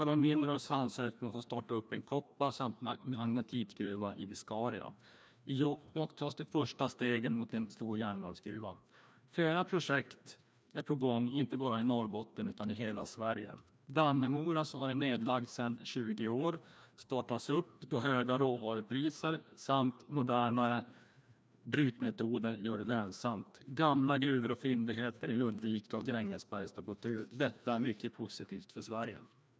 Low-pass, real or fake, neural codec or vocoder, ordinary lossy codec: none; fake; codec, 16 kHz, 1 kbps, FreqCodec, larger model; none